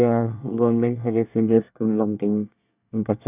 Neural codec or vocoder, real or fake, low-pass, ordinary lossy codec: codec, 24 kHz, 1 kbps, SNAC; fake; 3.6 kHz; none